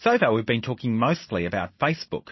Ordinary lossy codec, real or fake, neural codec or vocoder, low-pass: MP3, 24 kbps; real; none; 7.2 kHz